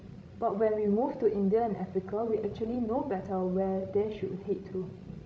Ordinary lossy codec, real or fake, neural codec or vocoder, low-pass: none; fake; codec, 16 kHz, 16 kbps, FreqCodec, larger model; none